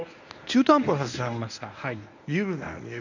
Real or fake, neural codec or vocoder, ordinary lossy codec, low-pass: fake; codec, 24 kHz, 0.9 kbps, WavTokenizer, medium speech release version 1; none; 7.2 kHz